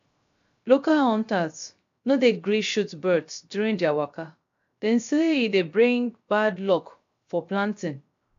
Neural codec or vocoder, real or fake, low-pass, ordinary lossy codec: codec, 16 kHz, 0.3 kbps, FocalCodec; fake; 7.2 kHz; AAC, 64 kbps